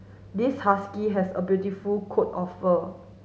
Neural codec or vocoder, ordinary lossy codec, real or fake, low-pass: none; none; real; none